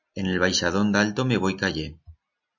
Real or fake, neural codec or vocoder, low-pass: real; none; 7.2 kHz